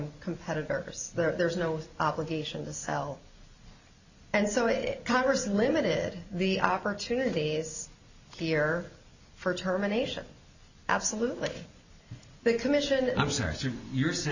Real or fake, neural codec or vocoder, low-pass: real; none; 7.2 kHz